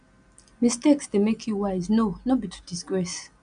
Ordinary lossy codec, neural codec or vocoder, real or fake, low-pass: none; none; real; 9.9 kHz